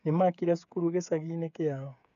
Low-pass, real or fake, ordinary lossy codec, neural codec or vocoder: 7.2 kHz; fake; none; codec, 16 kHz, 8 kbps, FreqCodec, smaller model